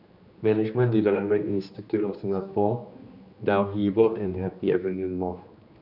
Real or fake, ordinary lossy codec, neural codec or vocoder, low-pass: fake; none; codec, 16 kHz, 2 kbps, X-Codec, HuBERT features, trained on general audio; 5.4 kHz